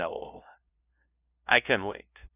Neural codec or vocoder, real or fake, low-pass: codec, 16 kHz, 0.5 kbps, FunCodec, trained on LibriTTS, 25 frames a second; fake; 3.6 kHz